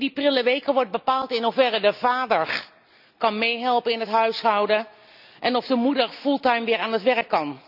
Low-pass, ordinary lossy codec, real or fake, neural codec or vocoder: 5.4 kHz; none; real; none